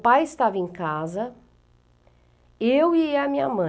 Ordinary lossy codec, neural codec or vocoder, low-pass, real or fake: none; none; none; real